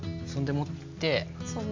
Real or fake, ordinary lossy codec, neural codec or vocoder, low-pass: real; MP3, 64 kbps; none; 7.2 kHz